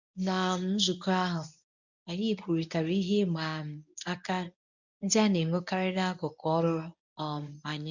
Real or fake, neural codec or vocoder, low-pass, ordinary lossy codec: fake; codec, 24 kHz, 0.9 kbps, WavTokenizer, medium speech release version 1; 7.2 kHz; none